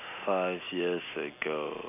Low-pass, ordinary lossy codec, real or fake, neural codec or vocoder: 3.6 kHz; none; real; none